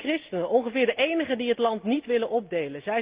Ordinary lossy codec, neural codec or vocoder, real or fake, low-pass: Opus, 32 kbps; none; real; 3.6 kHz